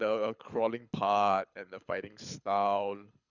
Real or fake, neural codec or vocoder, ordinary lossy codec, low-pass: fake; codec, 24 kHz, 6 kbps, HILCodec; none; 7.2 kHz